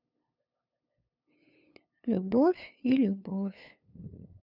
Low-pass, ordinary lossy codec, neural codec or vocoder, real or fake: 5.4 kHz; none; codec, 16 kHz, 2 kbps, FunCodec, trained on LibriTTS, 25 frames a second; fake